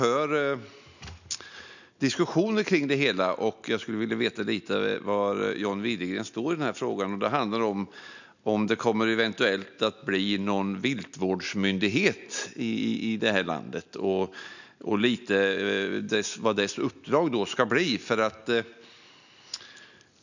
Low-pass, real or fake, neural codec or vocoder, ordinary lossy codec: 7.2 kHz; real; none; none